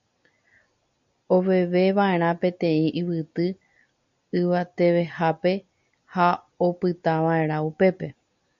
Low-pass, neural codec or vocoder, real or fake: 7.2 kHz; none; real